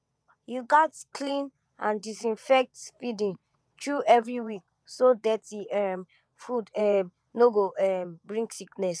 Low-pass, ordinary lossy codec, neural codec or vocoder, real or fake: none; none; vocoder, 22.05 kHz, 80 mel bands, WaveNeXt; fake